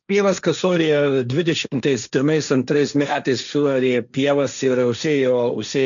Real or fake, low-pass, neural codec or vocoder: fake; 7.2 kHz; codec, 16 kHz, 1.1 kbps, Voila-Tokenizer